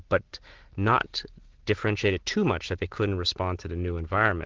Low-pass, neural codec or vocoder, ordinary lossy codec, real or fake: 7.2 kHz; none; Opus, 16 kbps; real